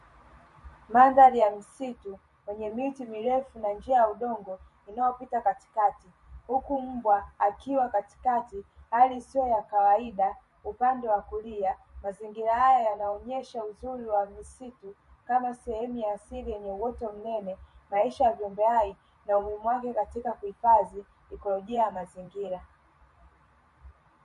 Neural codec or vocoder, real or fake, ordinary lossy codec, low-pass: vocoder, 44.1 kHz, 128 mel bands every 512 samples, BigVGAN v2; fake; MP3, 48 kbps; 14.4 kHz